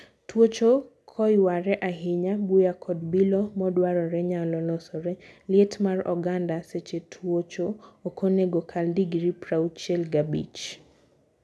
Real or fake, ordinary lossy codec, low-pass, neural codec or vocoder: real; none; none; none